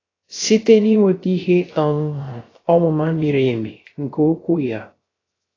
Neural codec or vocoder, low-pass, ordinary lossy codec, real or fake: codec, 16 kHz, 0.3 kbps, FocalCodec; 7.2 kHz; AAC, 32 kbps; fake